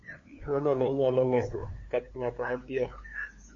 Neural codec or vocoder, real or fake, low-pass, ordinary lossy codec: codec, 16 kHz, 2 kbps, FunCodec, trained on LibriTTS, 25 frames a second; fake; 7.2 kHz; MP3, 48 kbps